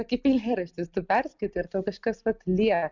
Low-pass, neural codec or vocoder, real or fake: 7.2 kHz; none; real